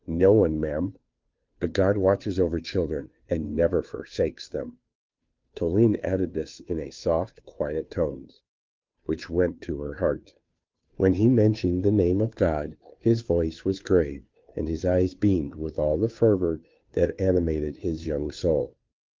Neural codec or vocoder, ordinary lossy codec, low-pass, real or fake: codec, 16 kHz, 2 kbps, FunCodec, trained on Chinese and English, 25 frames a second; Opus, 32 kbps; 7.2 kHz; fake